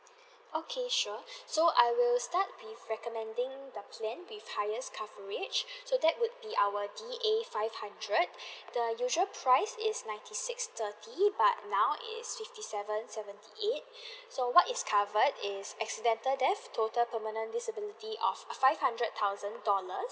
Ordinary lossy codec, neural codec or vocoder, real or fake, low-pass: none; none; real; none